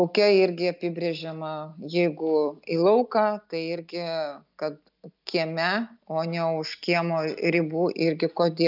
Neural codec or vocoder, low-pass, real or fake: none; 5.4 kHz; real